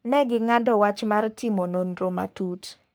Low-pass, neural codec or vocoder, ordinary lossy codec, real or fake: none; codec, 44.1 kHz, 3.4 kbps, Pupu-Codec; none; fake